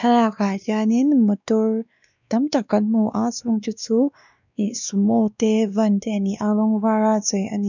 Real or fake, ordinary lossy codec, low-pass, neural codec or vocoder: fake; none; 7.2 kHz; codec, 16 kHz, 2 kbps, X-Codec, WavLM features, trained on Multilingual LibriSpeech